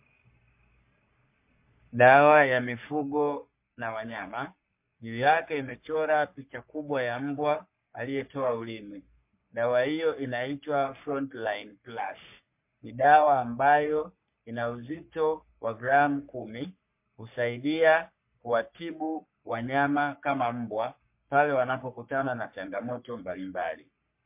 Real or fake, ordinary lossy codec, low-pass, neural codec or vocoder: fake; MP3, 32 kbps; 3.6 kHz; codec, 44.1 kHz, 3.4 kbps, Pupu-Codec